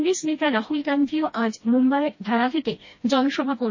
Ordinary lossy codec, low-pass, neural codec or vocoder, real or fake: MP3, 32 kbps; 7.2 kHz; codec, 16 kHz, 1 kbps, FreqCodec, smaller model; fake